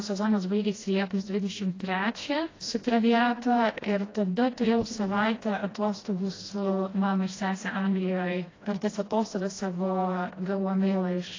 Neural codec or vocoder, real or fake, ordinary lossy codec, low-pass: codec, 16 kHz, 1 kbps, FreqCodec, smaller model; fake; AAC, 32 kbps; 7.2 kHz